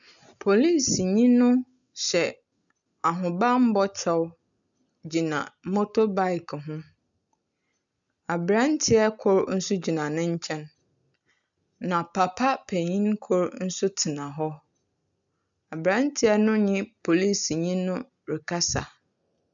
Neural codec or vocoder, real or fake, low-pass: none; real; 7.2 kHz